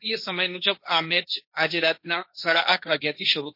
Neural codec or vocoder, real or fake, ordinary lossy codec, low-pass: codec, 16 kHz, 1.1 kbps, Voila-Tokenizer; fake; MP3, 32 kbps; 5.4 kHz